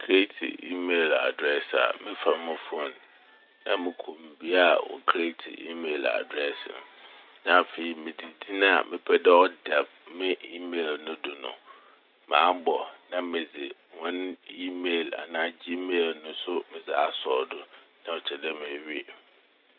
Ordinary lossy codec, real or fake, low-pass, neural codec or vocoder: none; real; 5.4 kHz; none